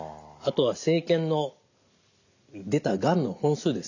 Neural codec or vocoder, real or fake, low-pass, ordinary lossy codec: none; real; 7.2 kHz; none